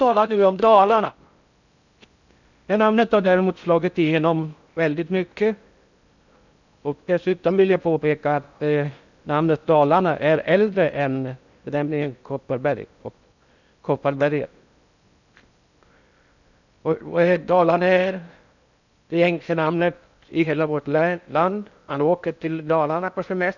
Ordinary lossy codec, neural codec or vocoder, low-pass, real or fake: none; codec, 16 kHz in and 24 kHz out, 0.6 kbps, FocalCodec, streaming, 4096 codes; 7.2 kHz; fake